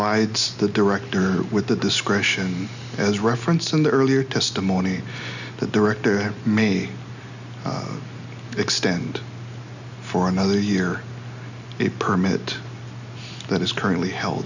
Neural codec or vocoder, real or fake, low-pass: none; real; 7.2 kHz